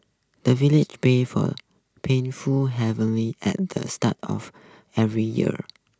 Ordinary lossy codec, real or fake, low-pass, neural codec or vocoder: none; real; none; none